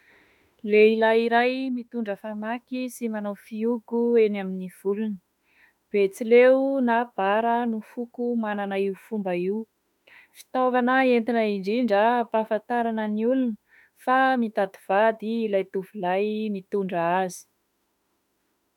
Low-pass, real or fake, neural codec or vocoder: 19.8 kHz; fake; autoencoder, 48 kHz, 32 numbers a frame, DAC-VAE, trained on Japanese speech